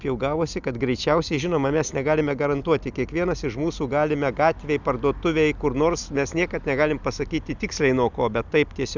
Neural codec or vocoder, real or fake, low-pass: none; real; 7.2 kHz